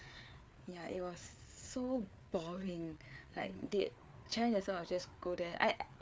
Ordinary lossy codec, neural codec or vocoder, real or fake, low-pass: none; codec, 16 kHz, 4 kbps, FreqCodec, larger model; fake; none